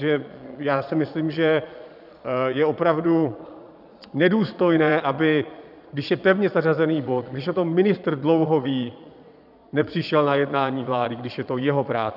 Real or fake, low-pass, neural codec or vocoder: fake; 5.4 kHz; vocoder, 22.05 kHz, 80 mel bands, Vocos